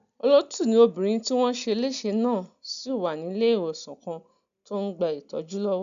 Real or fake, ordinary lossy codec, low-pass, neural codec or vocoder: real; none; 7.2 kHz; none